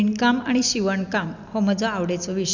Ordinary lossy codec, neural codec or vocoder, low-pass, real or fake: none; none; 7.2 kHz; real